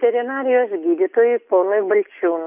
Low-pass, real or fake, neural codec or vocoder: 3.6 kHz; real; none